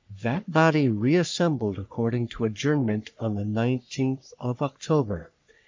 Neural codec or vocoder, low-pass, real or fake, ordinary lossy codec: codec, 44.1 kHz, 3.4 kbps, Pupu-Codec; 7.2 kHz; fake; MP3, 64 kbps